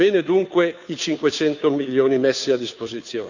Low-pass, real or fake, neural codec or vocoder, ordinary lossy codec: 7.2 kHz; fake; codec, 16 kHz, 2 kbps, FunCodec, trained on Chinese and English, 25 frames a second; none